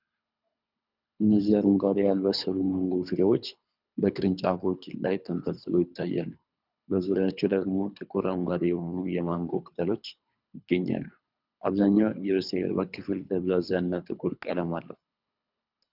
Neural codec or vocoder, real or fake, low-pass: codec, 24 kHz, 3 kbps, HILCodec; fake; 5.4 kHz